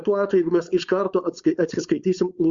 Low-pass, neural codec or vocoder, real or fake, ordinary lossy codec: 7.2 kHz; codec, 16 kHz, 4.8 kbps, FACodec; fake; Opus, 64 kbps